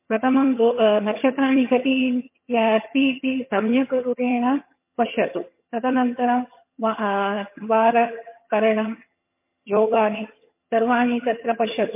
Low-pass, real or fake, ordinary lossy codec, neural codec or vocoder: 3.6 kHz; fake; MP3, 24 kbps; vocoder, 22.05 kHz, 80 mel bands, HiFi-GAN